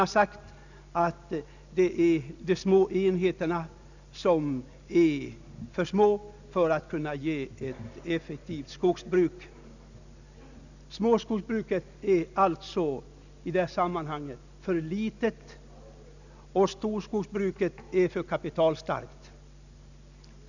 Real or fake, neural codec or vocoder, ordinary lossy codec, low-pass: real; none; none; 7.2 kHz